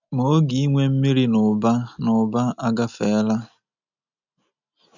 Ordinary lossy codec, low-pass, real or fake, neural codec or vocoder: none; 7.2 kHz; real; none